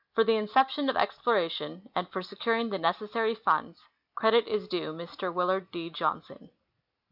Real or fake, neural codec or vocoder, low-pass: real; none; 5.4 kHz